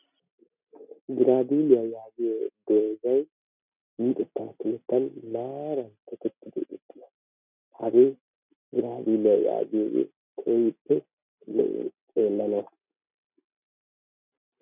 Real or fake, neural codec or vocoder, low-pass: real; none; 3.6 kHz